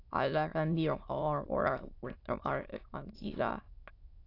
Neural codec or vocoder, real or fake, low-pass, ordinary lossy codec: autoencoder, 22.05 kHz, a latent of 192 numbers a frame, VITS, trained on many speakers; fake; 5.4 kHz; AAC, 32 kbps